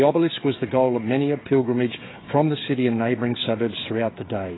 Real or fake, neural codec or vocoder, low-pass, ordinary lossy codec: fake; codec, 16 kHz, 16 kbps, FreqCodec, smaller model; 7.2 kHz; AAC, 16 kbps